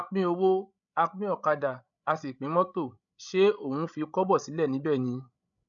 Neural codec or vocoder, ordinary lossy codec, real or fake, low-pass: codec, 16 kHz, 16 kbps, FreqCodec, larger model; none; fake; 7.2 kHz